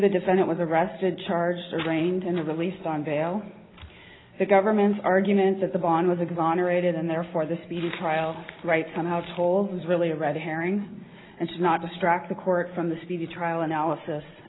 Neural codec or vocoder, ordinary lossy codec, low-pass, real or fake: none; AAC, 16 kbps; 7.2 kHz; real